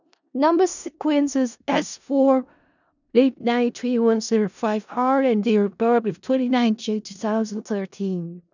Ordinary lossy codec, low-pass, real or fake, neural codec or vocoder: none; 7.2 kHz; fake; codec, 16 kHz in and 24 kHz out, 0.4 kbps, LongCat-Audio-Codec, four codebook decoder